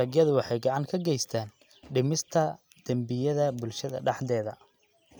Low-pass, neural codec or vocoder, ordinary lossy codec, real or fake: none; none; none; real